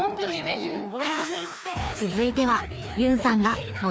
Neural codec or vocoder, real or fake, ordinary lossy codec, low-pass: codec, 16 kHz, 2 kbps, FreqCodec, larger model; fake; none; none